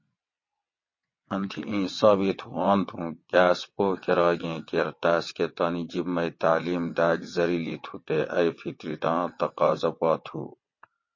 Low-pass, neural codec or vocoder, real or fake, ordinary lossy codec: 7.2 kHz; vocoder, 22.05 kHz, 80 mel bands, WaveNeXt; fake; MP3, 32 kbps